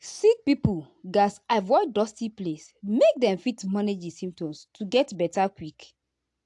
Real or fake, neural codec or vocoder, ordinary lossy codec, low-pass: real; none; AAC, 64 kbps; 10.8 kHz